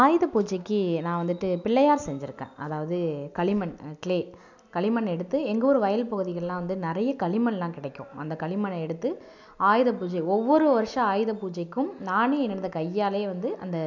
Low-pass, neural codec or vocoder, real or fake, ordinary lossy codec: 7.2 kHz; none; real; none